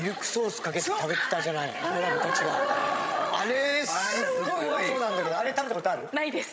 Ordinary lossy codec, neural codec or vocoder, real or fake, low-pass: none; codec, 16 kHz, 16 kbps, FreqCodec, larger model; fake; none